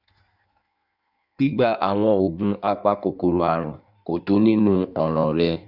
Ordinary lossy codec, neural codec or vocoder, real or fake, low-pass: none; codec, 16 kHz in and 24 kHz out, 1.1 kbps, FireRedTTS-2 codec; fake; 5.4 kHz